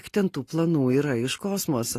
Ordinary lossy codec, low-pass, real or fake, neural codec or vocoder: AAC, 48 kbps; 14.4 kHz; real; none